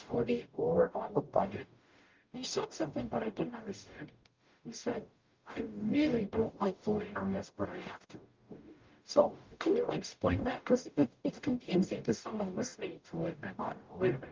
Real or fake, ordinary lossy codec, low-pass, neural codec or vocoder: fake; Opus, 24 kbps; 7.2 kHz; codec, 44.1 kHz, 0.9 kbps, DAC